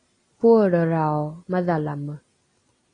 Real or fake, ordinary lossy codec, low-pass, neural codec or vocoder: real; AAC, 32 kbps; 9.9 kHz; none